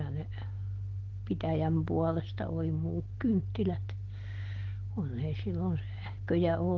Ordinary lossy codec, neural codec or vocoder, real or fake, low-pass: Opus, 16 kbps; none; real; 7.2 kHz